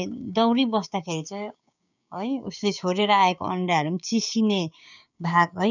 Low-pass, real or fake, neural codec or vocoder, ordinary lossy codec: 7.2 kHz; fake; codec, 16 kHz, 6 kbps, DAC; none